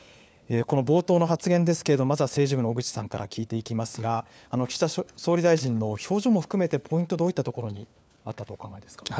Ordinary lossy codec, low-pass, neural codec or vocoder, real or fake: none; none; codec, 16 kHz, 4 kbps, FunCodec, trained on LibriTTS, 50 frames a second; fake